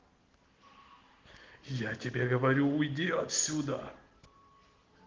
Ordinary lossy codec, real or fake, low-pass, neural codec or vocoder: Opus, 16 kbps; real; 7.2 kHz; none